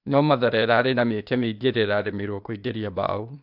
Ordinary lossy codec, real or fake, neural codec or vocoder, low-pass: none; fake; codec, 16 kHz, 0.8 kbps, ZipCodec; 5.4 kHz